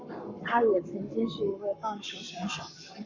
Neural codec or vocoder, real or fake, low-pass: codec, 44.1 kHz, 7.8 kbps, Pupu-Codec; fake; 7.2 kHz